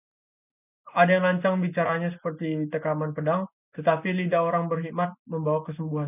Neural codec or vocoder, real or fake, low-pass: none; real; 3.6 kHz